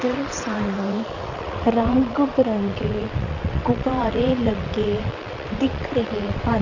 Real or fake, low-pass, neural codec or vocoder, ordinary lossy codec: fake; 7.2 kHz; vocoder, 22.05 kHz, 80 mel bands, WaveNeXt; Opus, 64 kbps